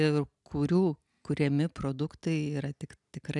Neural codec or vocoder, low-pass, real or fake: none; 10.8 kHz; real